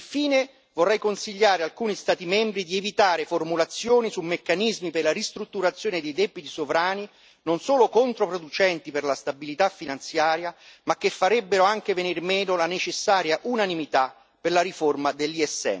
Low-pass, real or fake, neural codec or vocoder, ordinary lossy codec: none; real; none; none